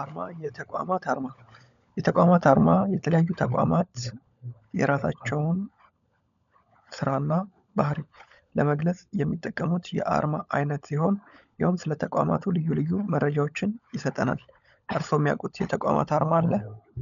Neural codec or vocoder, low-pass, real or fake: codec, 16 kHz, 16 kbps, FunCodec, trained on LibriTTS, 50 frames a second; 7.2 kHz; fake